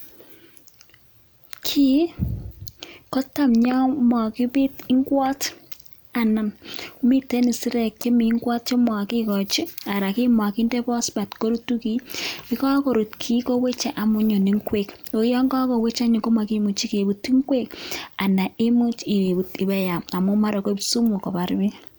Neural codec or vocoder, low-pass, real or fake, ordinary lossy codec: none; none; real; none